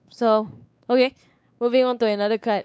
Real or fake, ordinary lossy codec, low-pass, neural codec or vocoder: fake; none; none; codec, 16 kHz, 4 kbps, X-Codec, WavLM features, trained on Multilingual LibriSpeech